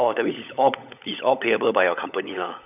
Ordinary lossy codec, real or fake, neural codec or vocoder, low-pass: none; fake; codec, 16 kHz, 16 kbps, FunCodec, trained on LibriTTS, 50 frames a second; 3.6 kHz